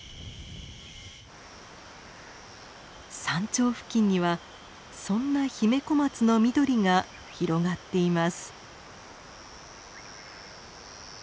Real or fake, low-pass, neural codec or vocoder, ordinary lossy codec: real; none; none; none